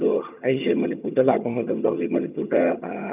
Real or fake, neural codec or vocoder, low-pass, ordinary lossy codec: fake; vocoder, 22.05 kHz, 80 mel bands, HiFi-GAN; 3.6 kHz; none